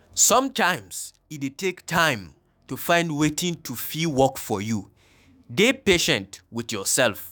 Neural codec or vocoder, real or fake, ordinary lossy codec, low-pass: autoencoder, 48 kHz, 128 numbers a frame, DAC-VAE, trained on Japanese speech; fake; none; none